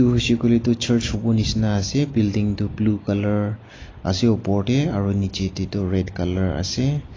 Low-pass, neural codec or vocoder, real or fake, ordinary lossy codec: 7.2 kHz; none; real; AAC, 32 kbps